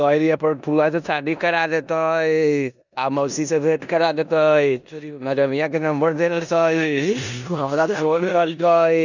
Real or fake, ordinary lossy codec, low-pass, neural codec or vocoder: fake; none; 7.2 kHz; codec, 16 kHz in and 24 kHz out, 0.9 kbps, LongCat-Audio-Codec, four codebook decoder